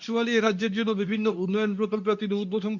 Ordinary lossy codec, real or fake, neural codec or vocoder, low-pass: none; fake; codec, 24 kHz, 0.9 kbps, WavTokenizer, medium speech release version 2; 7.2 kHz